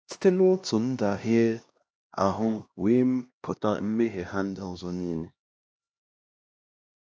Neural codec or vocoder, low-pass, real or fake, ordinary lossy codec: codec, 16 kHz, 1 kbps, X-Codec, HuBERT features, trained on LibriSpeech; none; fake; none